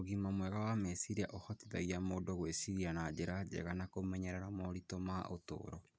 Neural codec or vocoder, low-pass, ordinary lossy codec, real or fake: none; none; none; real